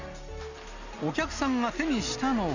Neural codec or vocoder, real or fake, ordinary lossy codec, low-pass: none; real; none; 7.2 kHz